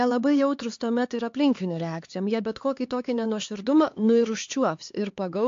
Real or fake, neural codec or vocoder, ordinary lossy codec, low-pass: fake; codec, 16 kHz, 4 kbps, X-Codec, HuBERT features, trained on LibriSpeech; AAC, 48 kbps; 7.2 kHz